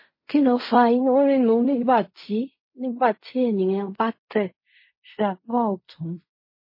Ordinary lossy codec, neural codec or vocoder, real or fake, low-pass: MP3, 24 kbps; codec, 16 kHz in and 24 kHz out, 0.4 kbps, LongCat-Audio-Codec, fine tuned four codebook decoder; fake; 5.4 kHz